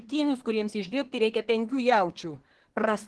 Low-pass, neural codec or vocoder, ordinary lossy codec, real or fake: 10.8 kHz; codec, 24 kHz, 1 kbps, SNAC; Opus, 16 kbps; fake